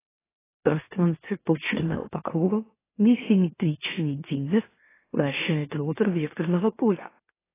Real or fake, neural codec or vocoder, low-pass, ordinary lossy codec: fake; autoencoder, 44.1 kHz, a latent of 192 numbers a frame, MeloTTS; 3.6 kHz; AAC, 16 kbps